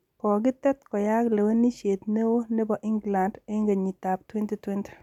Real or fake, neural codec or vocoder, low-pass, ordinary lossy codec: real; none; 19.8 kHz; none